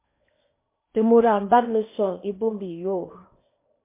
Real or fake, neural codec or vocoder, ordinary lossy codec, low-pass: fake; codec, 16 kHz in and 24 kHz out, 0.8 kbps, FocalCodec, streaming, 65536 codes; MP3, 24 kbps; 3.6 kHz